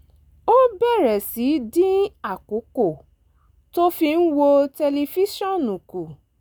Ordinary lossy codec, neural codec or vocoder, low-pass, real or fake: none; none; none; real